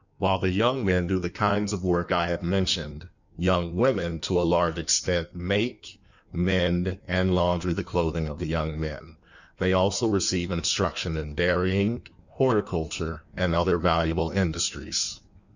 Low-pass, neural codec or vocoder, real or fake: 7.2 kHz; codec, 16 kHz in and 24 kHz out, 1.1 kbps, FireRedTTS-2 codec; fake